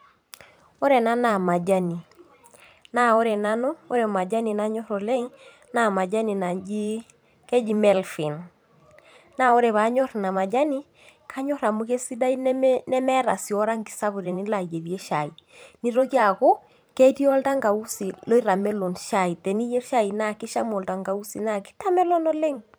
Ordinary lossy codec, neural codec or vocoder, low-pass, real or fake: none; vocoder, 44.1 kHz, 128 mel bands every 512 samples, BigVGAN v2; none; fake